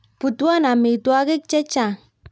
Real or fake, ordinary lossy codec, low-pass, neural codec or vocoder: real; none; none; none